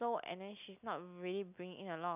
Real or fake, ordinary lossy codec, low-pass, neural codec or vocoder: real; none; 3.6 kHz; none